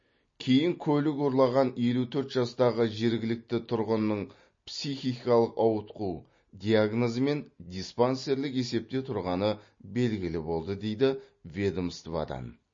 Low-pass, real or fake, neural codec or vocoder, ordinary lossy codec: 7.2 kHz; real; none; MP3, 32 kbps